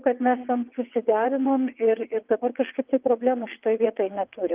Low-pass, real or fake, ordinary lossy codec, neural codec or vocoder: 3.6 kHz; fake; Opus, 24 kbps; vocoder, 22.05 kHz, 80 mel bands, WaveNeXt